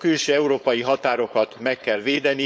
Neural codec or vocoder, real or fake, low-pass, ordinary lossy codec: codec, 16 kHz, 4.8 kbps, FACodec; fake; none; none